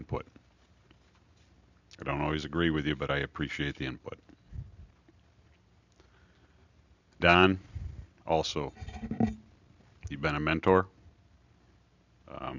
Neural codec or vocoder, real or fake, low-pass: none; real; 7.2 kHz